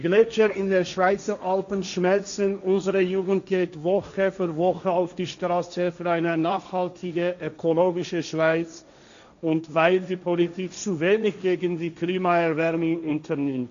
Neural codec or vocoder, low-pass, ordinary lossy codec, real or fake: codec, 16 kHz, 1.1 kbps, Voila-Tokenizer; 7.2 kHz; MP3, 96 kbps; fake